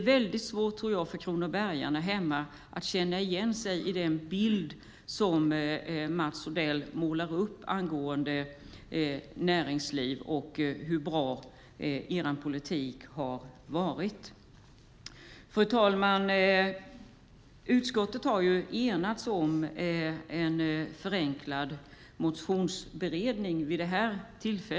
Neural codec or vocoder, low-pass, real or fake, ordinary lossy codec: none; none; real; none